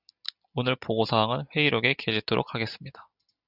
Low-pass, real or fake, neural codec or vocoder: 5.4 kHz; real; none